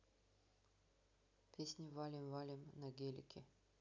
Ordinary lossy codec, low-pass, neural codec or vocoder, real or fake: none; 7.2 kHz; none; real